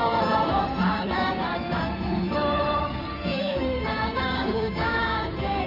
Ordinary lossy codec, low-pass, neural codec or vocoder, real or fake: none; 5.4 kHz; codec, 16 kHz in and 24 kHz out, 2.2 kbps, FireRedTTS-2 codec; fake